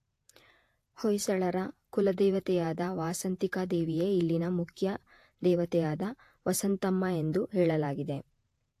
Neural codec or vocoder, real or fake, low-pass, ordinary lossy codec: vocoder, 48 kHz, 128 mel bands, Vocos; fake; 14.4 kHz; AAC, 64 kbps